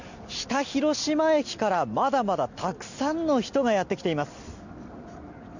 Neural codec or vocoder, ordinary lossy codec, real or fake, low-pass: none; none; real; 7.2 kHz